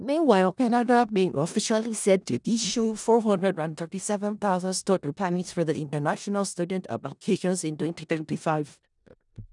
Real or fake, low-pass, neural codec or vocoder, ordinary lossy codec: fake; 10.8 kHz; codec, 16 kHz in and 24 kHz out, 0.4 kbps, LongCat-Audio-Codec, four codebook decoder; none